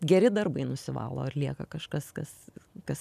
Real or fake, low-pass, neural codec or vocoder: real; 14.4 kHz; none